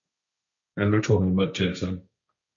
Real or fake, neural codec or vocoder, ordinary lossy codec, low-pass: fake; codec, 16 kHz, 1.1 kbps, Voila-Tokenizer; MP3, 48 kbps; 7.2 kHz